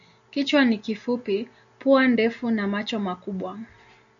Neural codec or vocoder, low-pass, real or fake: none; 7.2 kHz; real